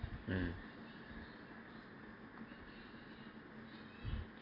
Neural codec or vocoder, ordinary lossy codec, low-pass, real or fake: none; MP3, 48 kbps; 5.4 kHz; real